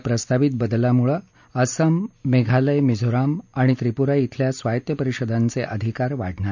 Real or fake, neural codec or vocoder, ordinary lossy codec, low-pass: real; none; none; 7.2 kHz